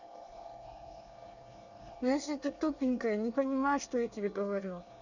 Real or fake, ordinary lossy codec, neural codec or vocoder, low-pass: fake; none; codec, 24 kHz, 1 kbps, SNAC; 7.2 kHz